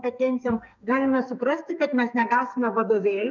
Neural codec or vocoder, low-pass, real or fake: codec, 44.1 kHz, 2.6 kbps, SNAC; 7.2 kHz; fake